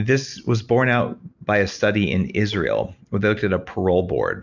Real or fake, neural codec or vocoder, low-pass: real; none; 7.2 kHz